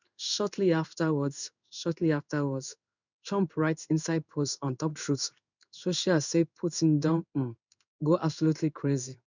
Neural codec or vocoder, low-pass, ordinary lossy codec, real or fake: codec, 16 kHz in and 24 kHz out, 1 kbps, XY-Tokenizer; 7.2 kHz; none; fake